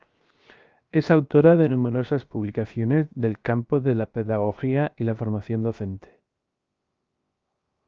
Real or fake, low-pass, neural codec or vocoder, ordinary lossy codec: fake; 7.2 kHz; codec, 16 kHz, 0.7 kbps, FocalCodec; Opus, 24 kbps